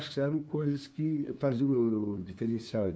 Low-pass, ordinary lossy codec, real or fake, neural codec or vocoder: none; none; fake; codec, 16 kHz, 2 kbps, FunCodec, trained on LibriTTS, 25 frames a second